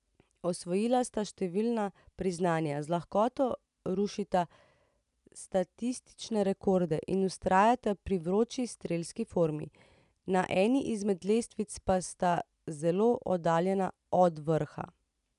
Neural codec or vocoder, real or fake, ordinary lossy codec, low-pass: none; real; none; 10.8 kHz